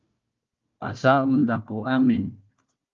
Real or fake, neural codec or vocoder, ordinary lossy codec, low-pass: fake; codec, 16 kHz, 1 kbps, FunCodec, trained on Chinese and English, 50 frames a second; Opus, 32 kbps; 7.2 kHz